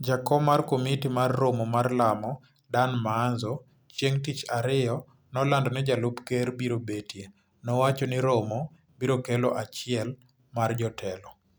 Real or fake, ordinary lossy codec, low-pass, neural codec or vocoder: real; none; none; none